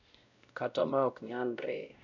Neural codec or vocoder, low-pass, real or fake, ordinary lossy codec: codec, 16 kHz, 0.5 kbps, X-Codec, WavLM features, trained on Multilingual LibriSpeech; 7.2 kHz; fake; none